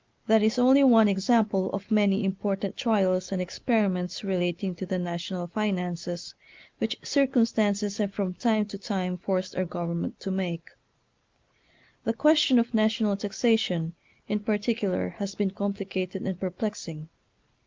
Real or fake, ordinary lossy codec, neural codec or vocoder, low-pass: real; Opus, 24 kbps; none; 7.2 kHz